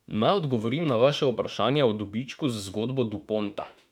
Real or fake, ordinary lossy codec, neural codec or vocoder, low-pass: fake; none; autoencoder, 48 kHz, 32 numbers a frame, DAC-VAE, trained on Japanese speech; 19.8 kHz